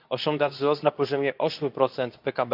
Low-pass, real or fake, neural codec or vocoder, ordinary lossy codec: 5.4 kHz; fake; codec, 24 kHz, 0.9 kbps, WavTokenizer, medium speech release version 2; none